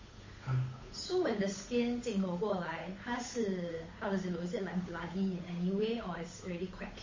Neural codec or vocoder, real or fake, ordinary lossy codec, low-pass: codec, 16 kHz, 8 kbps, FunCodec, trained on Chinese and English, 25 frames a second; fake; MP3, 32 kbps; 7.2 kHz